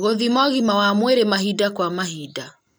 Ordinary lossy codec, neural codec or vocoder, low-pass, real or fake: none; none; none; real